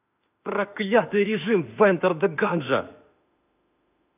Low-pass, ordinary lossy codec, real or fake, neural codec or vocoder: 3.6 kHz; none; fake; autoencoder, 48 kHz, 32 numbers a frame, DAC-VAE, trained on Japanese speech